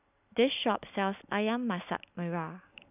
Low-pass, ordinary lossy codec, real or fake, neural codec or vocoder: 3.6 kHz; none; real; none